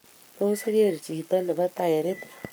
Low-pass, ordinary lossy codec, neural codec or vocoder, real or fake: none; none; codec, 44.1 kHz, 7.8 kbps, Pupu-Codec; fake